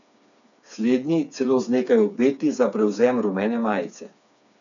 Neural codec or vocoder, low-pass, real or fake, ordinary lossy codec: codec, 16 kHz, 4 kbps, FreqCodec, smaller model; 7.2 kHz; fake; none